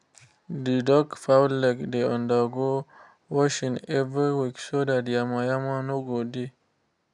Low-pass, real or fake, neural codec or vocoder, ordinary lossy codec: 10.8 kHz; real; none; none